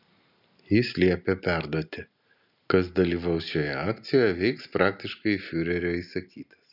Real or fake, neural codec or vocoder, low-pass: real; none; 5.4 kHz